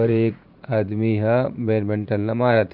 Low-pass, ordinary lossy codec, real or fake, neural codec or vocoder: 5.4 kHz; none; fake; vocoder, 44.1 kHz, 80 mel bands, Vocos